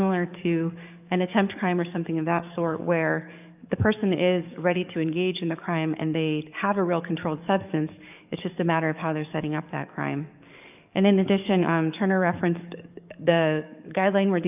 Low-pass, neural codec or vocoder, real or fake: 3.6 kHz; codec, 44.1 kHz, 7.8 kbps, DAC; fake